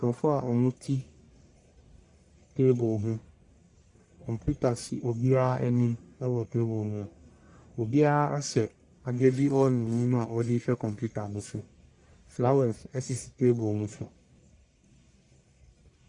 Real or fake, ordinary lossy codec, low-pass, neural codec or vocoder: fake; Opus, 64 kbps; 10.8 kHz; codec, 44.1 kHz, 1.7 kbps, Pupu-Codec